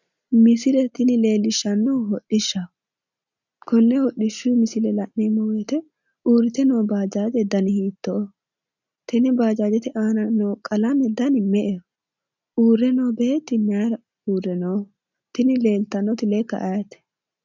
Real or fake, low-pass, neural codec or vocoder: real; 7.2 kHz; none